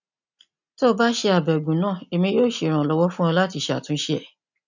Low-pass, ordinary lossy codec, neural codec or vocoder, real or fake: 7.2 kHz; none; none; real